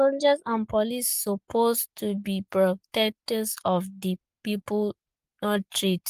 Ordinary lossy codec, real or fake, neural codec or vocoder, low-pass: Opus, 32 kbps; fake; codec, 44.1 kHz, 7.8 kbps, Pupu-Codec; 14.4 kHz